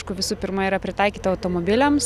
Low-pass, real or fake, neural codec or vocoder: 14.4 kHz; real; none